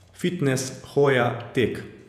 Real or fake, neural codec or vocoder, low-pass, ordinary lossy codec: fake; vocoder, 48 kHz, 128 mel bands, Vocos; 14.4 kHz; none